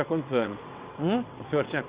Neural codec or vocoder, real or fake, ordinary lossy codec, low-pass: vocoder, 22.05 kHz, 80 mel bands, WaveNeXt; fake; Opus, 64 kbps; 3.6 kHz